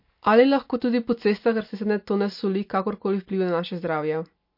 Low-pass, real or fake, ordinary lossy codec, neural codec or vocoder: 5.4 kHz; real; MP3, 32 kbps; none